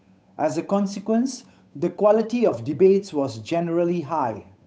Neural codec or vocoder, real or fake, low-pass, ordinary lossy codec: codec, 16 kHz, 8 kbps, FunCodec, trained on Chinese and English, 25 frames a second; fake; none; none